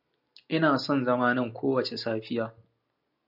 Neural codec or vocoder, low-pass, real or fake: none; 5.4 kHz; real